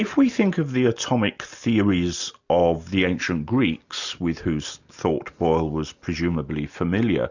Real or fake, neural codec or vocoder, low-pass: real; none; 7.2 kHz